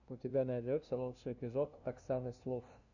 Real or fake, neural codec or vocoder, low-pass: fake; codec, 16 kHz, 0.5 kbps, FunCodec, trained on LibriTTS, 25 frames a second; 7.2 kHz